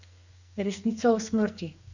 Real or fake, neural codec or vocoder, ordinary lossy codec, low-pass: fake; codec, 44.1 kHz, 2.6 kbps, SNAC; none; 7.2 kHz